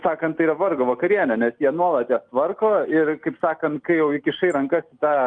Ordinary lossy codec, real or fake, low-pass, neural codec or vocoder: Opus, 32 kbps; real; 9.9 kHz; none